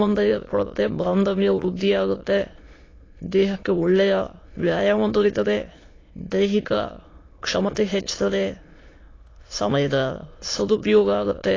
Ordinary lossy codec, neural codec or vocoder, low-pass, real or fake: AAC, 32 kbps; autoencoder, 22.05 kHz, a latent of 192 numbers a frame, VITS, trained on many speakers; 7.2 kHz; fake